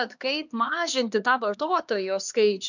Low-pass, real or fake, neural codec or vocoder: 7.2 kHz; fake; codec, 16 kHz, 2 kbps, X-Codec, HuBERT features, trained on LibriSpeech